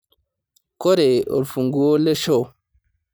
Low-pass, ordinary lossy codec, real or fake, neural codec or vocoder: none; none; real; none